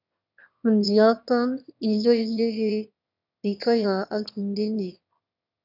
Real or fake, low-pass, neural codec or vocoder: fake; 5.4 kHz; autoencoder, 22.05 kHz, a latent of 192 numbers a frame, VITS, trained on one speaker